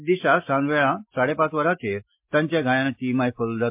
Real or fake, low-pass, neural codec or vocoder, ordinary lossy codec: real; 3.6 kHz; none; MP3, 32 kbps